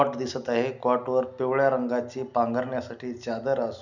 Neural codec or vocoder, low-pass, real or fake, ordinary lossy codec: none; 7.2 kHz; real; none